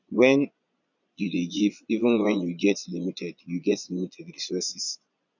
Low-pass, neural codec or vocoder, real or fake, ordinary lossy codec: 7.2 kHz; vocoder, 22.05 kHz, 80 mel bands, Vocos; fake; none